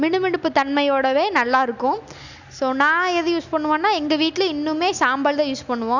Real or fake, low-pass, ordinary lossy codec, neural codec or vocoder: real; 7.2 kHz; none; none